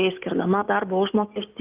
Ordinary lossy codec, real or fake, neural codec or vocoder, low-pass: Opus, 32 kbps; real; none; 3.6 kHz